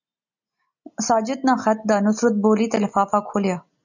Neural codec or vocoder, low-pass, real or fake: none; 7.2 kHz; real